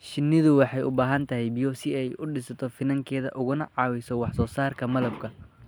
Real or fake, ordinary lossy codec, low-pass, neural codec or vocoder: real; none; none; none